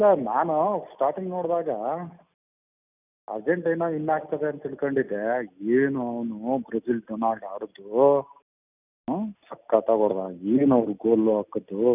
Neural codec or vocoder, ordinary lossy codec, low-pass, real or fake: none; none; 3.6 kHz; real